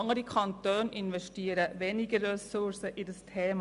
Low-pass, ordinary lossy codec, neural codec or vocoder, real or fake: 10.8 kHz; AAC, 96 kbps; none; real